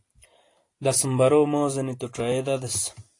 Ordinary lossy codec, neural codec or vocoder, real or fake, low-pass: AAC, 48 kbps; none; real; 10.8 kHz